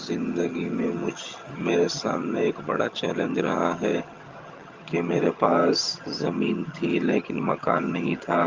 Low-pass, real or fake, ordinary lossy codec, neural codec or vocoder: 7.2 kHz; fake; Opus, 32 kbps; vocoder, 22.05 kHz, 80 mel bands, HiFi-GAN